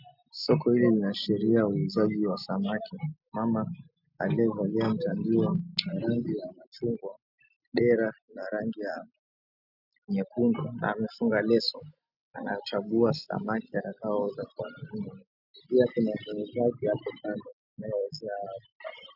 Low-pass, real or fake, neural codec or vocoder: 5.4 kHz; real; none